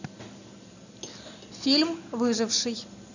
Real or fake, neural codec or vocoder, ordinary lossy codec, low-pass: real; none; none; 7.2 kHz